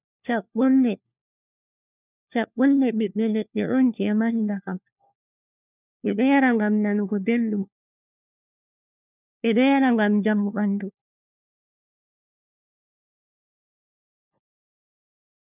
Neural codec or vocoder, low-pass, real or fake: codec, 16 kHz, 1 kbps, FunCodec, trained on LibriTTS, 50 frames a second; 3.6 kHz; fake